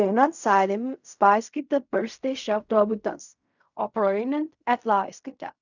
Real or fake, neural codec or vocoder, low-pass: fake; codec, 16 kHz in and 24 kHz out, 0.4 kbps, LongCat-Audio-Codec, fine tuned four codebook decoder; 7.2 kHz